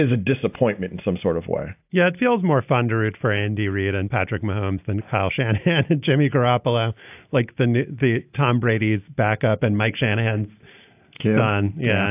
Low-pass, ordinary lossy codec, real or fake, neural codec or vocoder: 3.6 kHz; AAC, 32 kbps; real; none